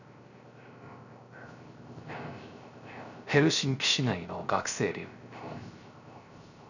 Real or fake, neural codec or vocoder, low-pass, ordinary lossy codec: fake; codec, 16 kHz, 0.3 kbps, FocalCodec; 7.2 kHz; none